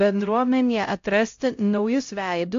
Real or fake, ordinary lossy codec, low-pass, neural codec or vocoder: fake; AAC, 48 kbps; 7.2 kHz; codec, 16 kHz, 0.5 kbps, X-Codec, HuBERT features, trained on LibriSpeech